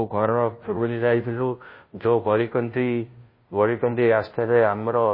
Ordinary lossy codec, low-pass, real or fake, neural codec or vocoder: MP3, 24 kbps; 5.4 kHz; fake; codec, 16 kHz, 0.5 kbps, FunCodec, trained on Chinese and English, 25 frames a second